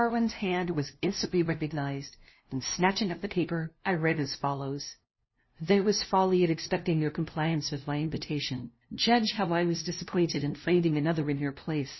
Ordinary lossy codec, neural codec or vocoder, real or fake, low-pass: MP3, 24 kbps; codec, 16 kHz, 0.5 kbps, FunCodec, trained on LibriTTS, 25 frames a second; fake; 7.2 kHz